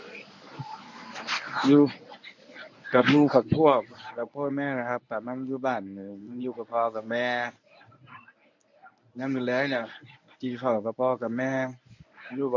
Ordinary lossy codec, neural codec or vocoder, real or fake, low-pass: MP3, 64 kbps; codec, 24 kHz, 0.9 kbps, WavTokenizer, medium speech release version 1; fake; 7.2 kHz